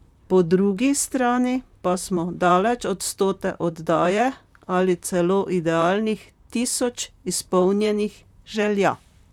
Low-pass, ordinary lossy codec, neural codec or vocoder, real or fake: 19.8 kHz; none; vocoder, 44.1 kHz, 128 mel bands, Pupu-Vocoder; fake